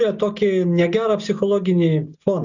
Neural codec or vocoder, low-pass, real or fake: none; 7.2 kHz; real